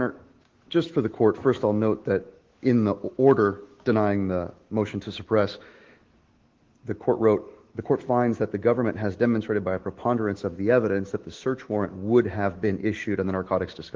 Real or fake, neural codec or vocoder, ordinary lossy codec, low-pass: fake; autoencoder, 48 kHz, 128 numbers a frame, DAC-VAE, trained on Japanese speech; Opus, 16 kbps; 7.2 kHz